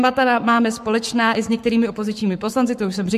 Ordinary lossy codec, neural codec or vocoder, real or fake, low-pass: MP3, 64 kbps; codec, 44.1 kHz, 7.8 kbps, Pupu-Codec; fake; 14.4 kHz